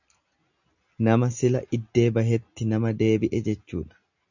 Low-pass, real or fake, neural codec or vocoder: 7.2 kHz; real; none